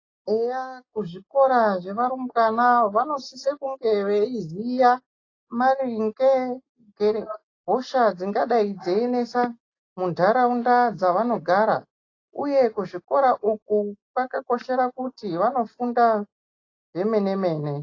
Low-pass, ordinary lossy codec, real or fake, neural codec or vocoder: 7.2 kHz; AAC, 32 kbps; real; none